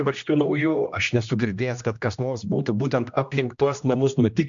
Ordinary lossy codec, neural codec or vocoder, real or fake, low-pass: MP3, 64 kbps; codec, 16 kHz, 1 kbps, X-Codec, HuBERT features, trained on general audio; fake; 7.2 kHz